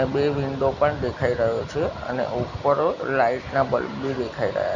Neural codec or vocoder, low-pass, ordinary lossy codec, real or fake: none; 7.2 kHz; Opus, 64 kbps; real